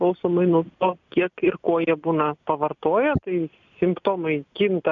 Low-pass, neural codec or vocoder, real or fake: 7.2 kHz; none; real